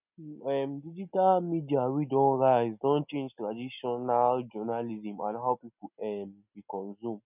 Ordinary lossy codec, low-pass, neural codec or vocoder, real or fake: none; 3.6 kHz; none; real